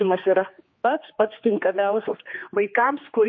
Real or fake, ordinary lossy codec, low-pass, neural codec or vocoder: fake; MP3, 32 kbps; 7.2 kHz; codec, 16 kHz, 2 kbps, X-Codec, HuBERT features, trained on general audio